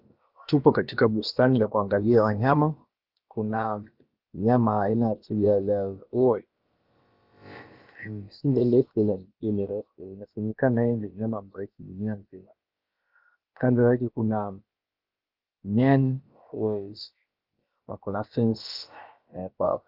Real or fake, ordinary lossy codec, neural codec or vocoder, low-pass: fake; Opus, 32 kbps; codec, 16 kHz, about 1 kbps, DyCAST, with the encoder's durations; 5.4 kHz